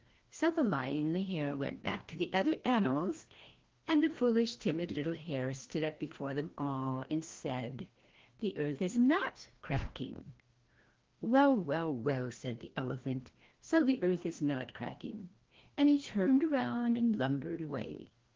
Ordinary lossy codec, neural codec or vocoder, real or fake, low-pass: Opus, 16 kbps; codec, 16 kHz, 1 kbps, FreqCodec, larger model; fake; 7.2 kHz